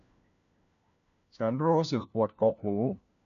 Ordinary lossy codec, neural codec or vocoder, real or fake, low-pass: none; codec, 16 kHz, 1 kbps, FunCodec, trained on LibriTTS, 50 frames a second; fake; 7.2 kHz